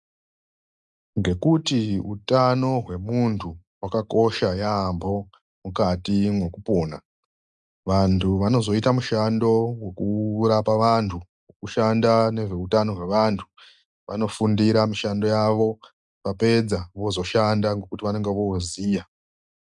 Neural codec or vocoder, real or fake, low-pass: none; real; 10.8 kHz